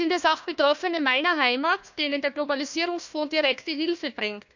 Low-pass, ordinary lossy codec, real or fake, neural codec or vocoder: 7.2 kHz; none; fake; codec, 16 kHz, 1 kbps, FunCodec, trained on Chinese and English, 50 frames a second